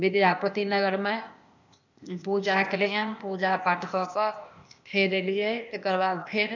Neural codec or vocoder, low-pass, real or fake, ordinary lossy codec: codec, 16 kHz, 0.8 kbps, ZipCodec; 7.2 kHz; fake; none